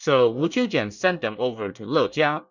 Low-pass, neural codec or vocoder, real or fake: 7.2 kHz; codec, 24 kHz, 1 kbps, SNAC; fake